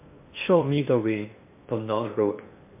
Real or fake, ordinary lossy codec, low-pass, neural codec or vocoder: fake; MP3, 24 kbps; 3.6 kHz; codec, 16 kHz in and 24 kHz out, 0.8 kbps, FocalCodec, streaming, 65536 codes